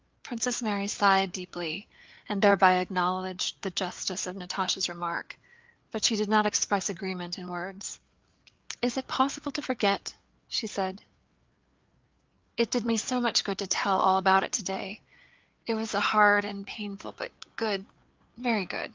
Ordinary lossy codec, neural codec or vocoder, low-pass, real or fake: Opus, 24 kbps; codec, 16 kHz, 4 kbps, FreqCodec, larger model; 7.2 kHz; fake